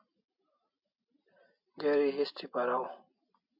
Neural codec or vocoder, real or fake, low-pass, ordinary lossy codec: none; real; 5.4 kHz; MP3, 48 kbps